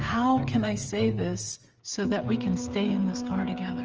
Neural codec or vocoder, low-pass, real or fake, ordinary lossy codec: codec, 16 kHz, 8 kbps, FreqCodec, smaller model; 7.2 kHz; fake; Opus, 24 kbps